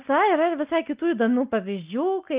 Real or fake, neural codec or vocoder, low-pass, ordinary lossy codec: real; none; 3.6 kHz; Opus, 24 kbps